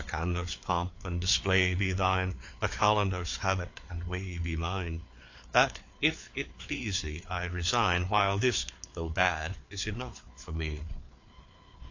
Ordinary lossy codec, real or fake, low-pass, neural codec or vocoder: AAC, 48 kbps; fake; 7.2 kHz; codec, 16 kHz, 4 kbps, FreqCodec, larger model